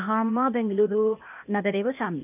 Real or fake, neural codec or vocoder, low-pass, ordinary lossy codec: fake; codec, 16 kHz, 0.8 kbps, ZipCodec; 3.6 kHz; none